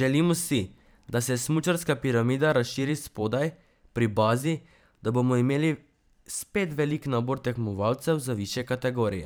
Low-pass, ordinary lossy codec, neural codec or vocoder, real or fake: none; none; vocoder, 44.1 kHz, 128 mel bands every 512 samples, BigVGAN v2; fake